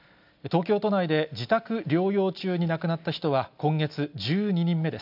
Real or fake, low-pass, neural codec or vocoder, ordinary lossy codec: real; 5.4 kHz; none; none